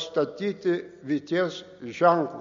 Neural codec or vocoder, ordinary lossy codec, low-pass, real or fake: none; MP3, 48 kbps; 7.2 kHz; real